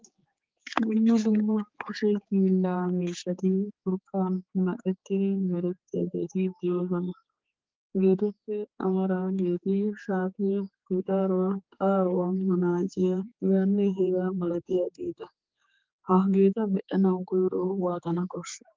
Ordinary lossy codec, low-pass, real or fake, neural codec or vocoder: Opus, 32 kbps; 7.2 kHz; fake; codec, 16 kHz, 4 kbps, X-Codec, HuBERT features, trained on general audio